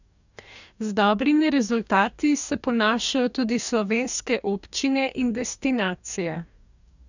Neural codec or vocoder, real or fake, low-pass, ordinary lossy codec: codec, 44.1 kHz, 2.6 kbps, DAC; fake; 7.2 kHz; none